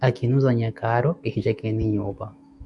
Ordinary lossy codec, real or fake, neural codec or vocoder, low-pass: MP3, 96 kbps; fake; autoencoder, 48 kHz, 128 numbers a frame, DAC-VAE, trained on Japanese speech; 10.8 kHz